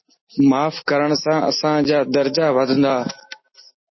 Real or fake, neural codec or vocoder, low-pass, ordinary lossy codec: real; none; 7.2 kHz; MP3, 24 kbps